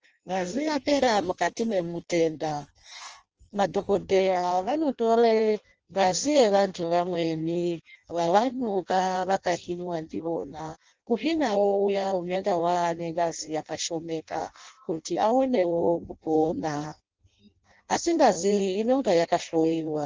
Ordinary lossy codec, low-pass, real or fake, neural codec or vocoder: Opus, 24 kbps; 7.2 kHz; fake; codec, 16 kHz in and 24 kHz out, 0.6 kbps, FireRedTTS-2 codec